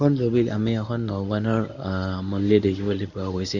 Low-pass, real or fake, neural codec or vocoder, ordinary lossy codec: 7.2 kHz; fake; codec, 24 kHz, 0.9 kbps, WavTokenizer, medium speech release version 1; none